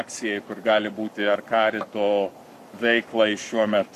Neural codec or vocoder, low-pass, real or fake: codec, 44.1 kHz, 7.8 kbps, Pupu-Codec; 14.4 kHz; fake